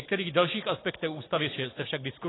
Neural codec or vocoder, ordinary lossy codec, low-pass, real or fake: none; AAC, 16 kbps; 7.2 kHz; real